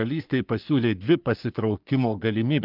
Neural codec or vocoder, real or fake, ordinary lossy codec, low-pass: codec, 44.1 kHz, 3.4 kbps, Pupu-Codec; fake; Opus, 32 kbps; 5.4 kHz